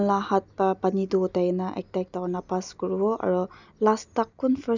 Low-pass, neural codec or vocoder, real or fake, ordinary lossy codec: 7.2 kHz; none; real; none